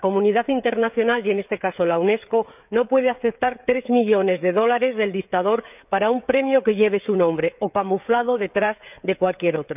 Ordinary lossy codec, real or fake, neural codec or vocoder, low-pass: none; fake; codec, 16 kHz, 16 kbps, FreqCodec, smaller model; 3.6 kHz